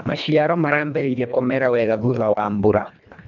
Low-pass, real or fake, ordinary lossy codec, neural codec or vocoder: 7.2 kHz; fake; none; codec, 24 kHz, 1.5 kbps, HILCodec